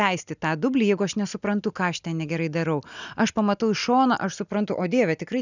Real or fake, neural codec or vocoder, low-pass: real; none; 7.2 kHz